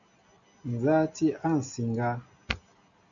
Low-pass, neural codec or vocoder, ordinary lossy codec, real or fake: 7.2 kHz; none; MP3, 96 kbps; real